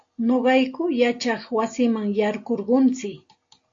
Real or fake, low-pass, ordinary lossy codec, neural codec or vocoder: real; 7.2 kHz; AAC, 48 kbps; none